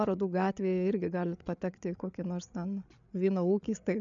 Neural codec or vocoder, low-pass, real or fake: codec, 16 kHz, 8 kbps, FreqCodec, larger model; 7.2 kHz; fake